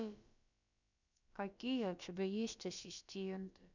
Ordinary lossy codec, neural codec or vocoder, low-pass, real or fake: none; codec, 16 kHz, about 1 kbps, DyCAST, with the encoder's durations; 7.2 kHz; fake